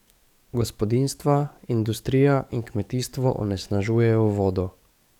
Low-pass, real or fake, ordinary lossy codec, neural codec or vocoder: 19.8 kHz; fake; none; codec, 44.1 kHz, 7.8 kbps, DAC